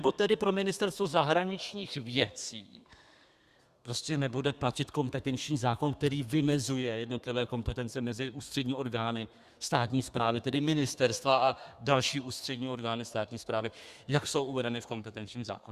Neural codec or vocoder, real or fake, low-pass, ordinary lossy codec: codec, 32 kHz, 1.9 kbps, SNAC; fake; 14.4 kHz; Opus, 64 kbps